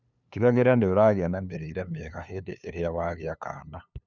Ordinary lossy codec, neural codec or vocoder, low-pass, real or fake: none; codec, 16 kHz, 2 kbps, FunCodec, trained on LibriTTS, 25 frames a second; 7.2 kHz; fake